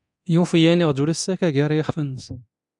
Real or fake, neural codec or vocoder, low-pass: fake; codec, 24 kHz, 0.9 kbps, DualCodec; 10.8 kHz